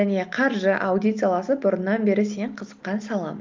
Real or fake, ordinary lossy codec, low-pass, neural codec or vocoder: real; Opus, 24 kbps; 7.2 kHz; none